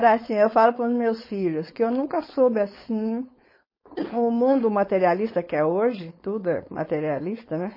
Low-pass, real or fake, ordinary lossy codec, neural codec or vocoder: 5.4 kHz; fake; MP3, 24 kbps; codec, 16 kHz, 4.8 kbps, FACodec